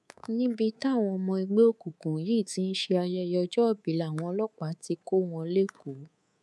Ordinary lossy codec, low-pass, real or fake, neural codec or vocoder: none; none; fake; codec, 24 kHz, 3.1 kbps, DualCodec